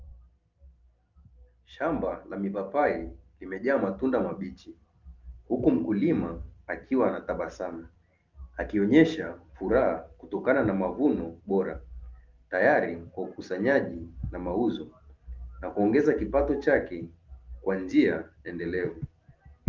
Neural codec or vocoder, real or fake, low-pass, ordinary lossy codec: none; real; 7.2 kHz; Opus, 32 kbps